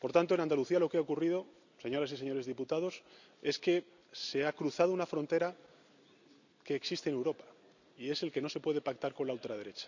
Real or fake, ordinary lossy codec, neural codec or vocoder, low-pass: real; none; none; 7.2 kHz